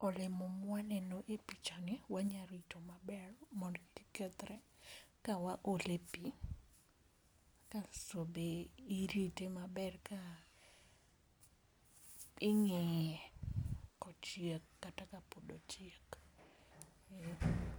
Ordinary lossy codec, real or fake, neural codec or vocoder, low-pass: none; real; none; none